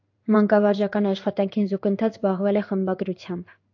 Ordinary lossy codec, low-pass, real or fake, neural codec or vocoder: AAC, 48 kbps; 7.2 kHz; fake; codec, 16 kHz in and 24 kHz out, 1 kbps, XY-Tokenizer